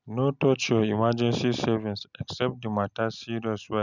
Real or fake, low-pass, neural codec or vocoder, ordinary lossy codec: real; 7.2 kHz; none; none